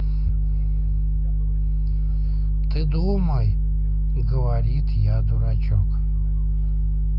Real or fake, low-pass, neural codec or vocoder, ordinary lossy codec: real; 5.4 kHz; none; none